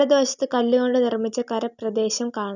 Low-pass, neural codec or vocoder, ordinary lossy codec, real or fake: 7.2 kHz; none; none; real